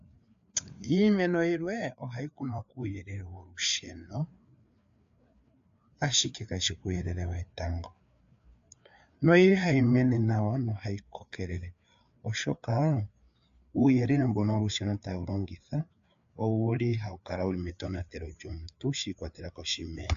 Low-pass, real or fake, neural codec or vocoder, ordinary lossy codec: 7.2 kHz; fake; codec, 16 kHz, 4 kbps, FreqCodec, larger model; AAC, 64 kbps